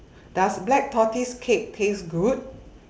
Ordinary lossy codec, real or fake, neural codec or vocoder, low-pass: none; real; none; none